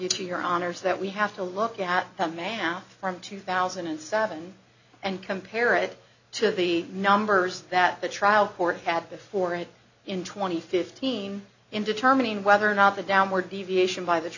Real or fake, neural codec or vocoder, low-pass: real; none; 7.2 kHz